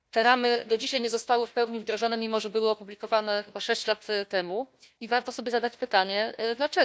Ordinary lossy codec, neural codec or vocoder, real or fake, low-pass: none; codec, 16 kHz, 1 kbps, FunCodec, trained on Chinese and English, 50 frames a second; fake; none